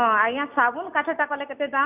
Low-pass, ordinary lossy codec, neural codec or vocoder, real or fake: 3.6 kHz; none; none; real